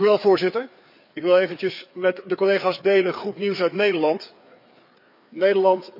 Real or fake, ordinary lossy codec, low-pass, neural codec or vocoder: fake; none; 5.4 kHz; codec, 16 kHz, 4 kbps, FreqCodec, larger model